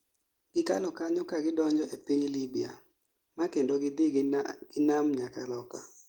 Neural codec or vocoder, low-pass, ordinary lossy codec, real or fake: none; 19.8 kHz; Opus, 24 kbps; real